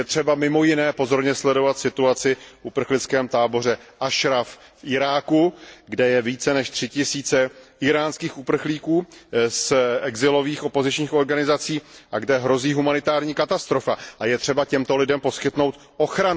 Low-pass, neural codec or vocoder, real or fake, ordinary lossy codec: none; none; real; none